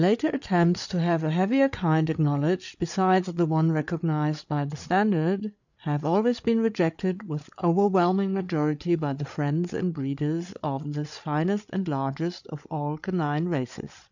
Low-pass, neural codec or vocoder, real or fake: 7.2 kHz; codec, 16 kHz, 8 kbps, FreqCodec, larger model; fake